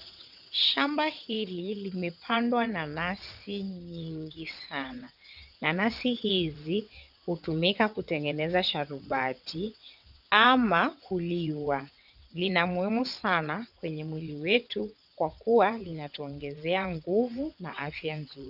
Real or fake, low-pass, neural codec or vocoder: fake; 5.4 kHz; vocoder, 22.05 kHz, 80 mel bands, WaveNeXt